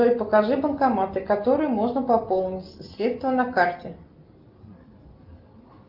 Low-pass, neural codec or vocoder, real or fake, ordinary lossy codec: 5.4 kHz; none; real; Opus, 24 kbps